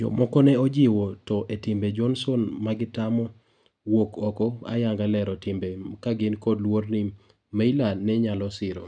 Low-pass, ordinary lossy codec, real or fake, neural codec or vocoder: 9.9 kHz; none; real; none